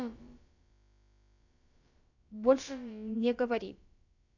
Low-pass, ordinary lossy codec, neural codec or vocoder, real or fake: 7.2 kHz; none; codec, 16 kHz, about 1 kbps, DyCAST, with the encoder's durations; fake